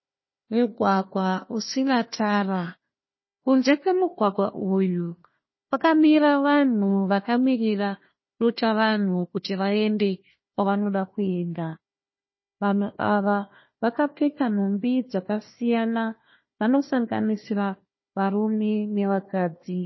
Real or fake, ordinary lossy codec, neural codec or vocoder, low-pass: fake; MP3, 24 kbps; codec, 16 kHz, 1 kbps, FunCodec, trained on Chinese and English, 50 frames a second; 7.2 kHz